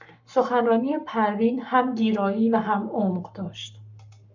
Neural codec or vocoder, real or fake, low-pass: codec, 44.1 kHz, 7.8 kbps, Pupu-Codec; fake; 7.2 kHz